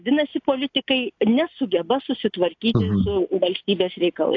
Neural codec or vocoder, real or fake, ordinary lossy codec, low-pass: none; real; AAC, 48 kbps; 7.2 kHz